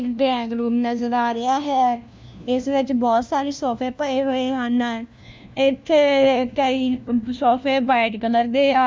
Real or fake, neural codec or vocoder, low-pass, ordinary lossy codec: fake; codec, 16 kHz, 1 kbps, FunCodec, trained on LibriTTS, 50 frames a second; none; none